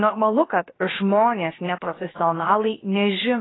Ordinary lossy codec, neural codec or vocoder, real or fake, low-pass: AAC, 16 kbps; codec, 16 kHz, about 1 kbps, DyCAST, with the encoder's durations; fake; 7.2 kHz